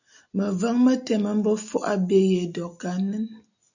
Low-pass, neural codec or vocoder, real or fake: 7.2 kHz; none; real